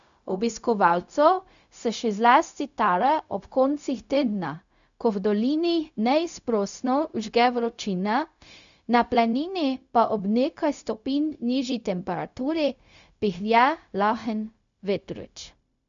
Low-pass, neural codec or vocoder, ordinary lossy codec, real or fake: 7.2 kHz; codec, 16 kHz, 0.4 kbps, LongCat-Audio-Codec; MP3, 96 kbps; fake